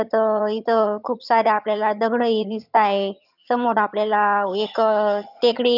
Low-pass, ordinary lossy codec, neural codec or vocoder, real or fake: 5.4 kHz; none; vocoder, 22.05 kHz, 80 mel bands, HiFi-GAN; fake